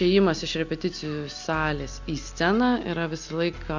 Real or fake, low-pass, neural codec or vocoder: real; 7.2 kHz; none